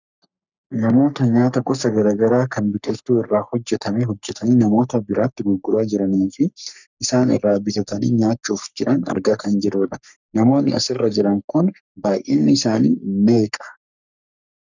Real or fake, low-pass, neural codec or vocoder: fake; 7.2 kHz; codec, 44.1 kHz, 3.4 kbps, Pupu-Codec